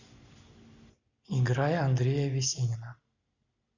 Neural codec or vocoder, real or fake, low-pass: none; real; 7.2 kHz